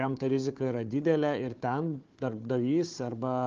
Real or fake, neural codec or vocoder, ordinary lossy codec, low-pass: fake; codec, 16 kHz, 4 kbps, FunCodec, trained on Chinese and English, 50 frames a second; Opus, 24 kbps; 7.2 kHz